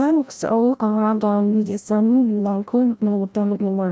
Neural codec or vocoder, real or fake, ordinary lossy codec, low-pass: codec, 16 kHz, 0.5 kbps, FreqCodec, larger model; fake; none; none